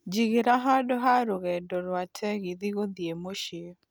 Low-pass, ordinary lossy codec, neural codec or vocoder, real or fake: none; none; none; real